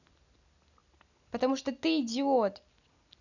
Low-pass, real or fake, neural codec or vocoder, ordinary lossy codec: 7.2 kHz; real; none; none